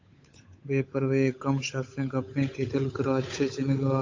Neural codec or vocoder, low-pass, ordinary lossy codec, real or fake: codec, 16 kHz, 8 kbps, FunCodec, trained on Chinese and English, 25 frames a second; 7.2 kHz; AAC, 48 kbps; fake